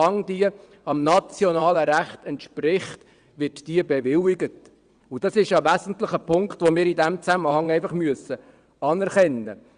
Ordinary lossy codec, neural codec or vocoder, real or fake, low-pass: Opus, 64 kbps; vocoder, 22.05 kHz, 80 mel bands, WaveNeXt; fake; 9.9 kHz